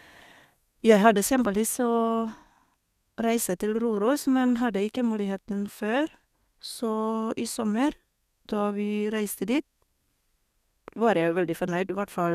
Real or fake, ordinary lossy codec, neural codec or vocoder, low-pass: fake; none; codec, 32 kHz, 1.9 kbps, SNAC; 14.4 kHz